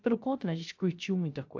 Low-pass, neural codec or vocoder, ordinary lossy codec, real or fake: 7.2 kHz; codec, 16 kHz, 0.3 kbps, FocalCodec; AAC, 48 kbps; fake